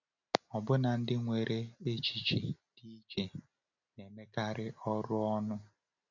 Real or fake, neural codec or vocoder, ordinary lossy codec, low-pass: real; none; none; 7.2 kHz